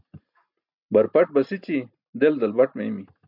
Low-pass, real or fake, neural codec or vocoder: 5.4 kHz; real; none